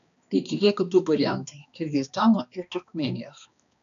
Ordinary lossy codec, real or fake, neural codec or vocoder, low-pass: MP3, 96 kbps; fake; codec, 16 kHz, 2 kbps, X-Codec, HuBERT features, trained on balanced general audio; 7.2 kHz